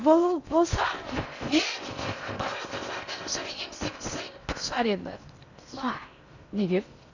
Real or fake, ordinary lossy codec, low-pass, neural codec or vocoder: fake; none; 7.2 kHz; codec, 16 kHz in and 24 kHz out, 0.6 kbps, FocalCodec, streaming, 4096 codes